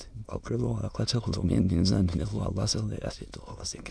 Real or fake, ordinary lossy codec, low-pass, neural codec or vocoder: fake; none; none; autoencoder, 22.05 kHz, a latent of 192 numbers a frame, VITS, trained on many speakers